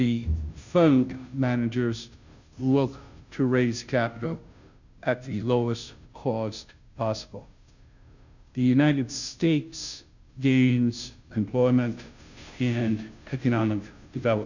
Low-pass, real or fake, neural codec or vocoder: 7.2 kHz; fake; codec, 16 kHz, 0.5 kbps, FunCodec, trained on Chinese and English, 25 frames a second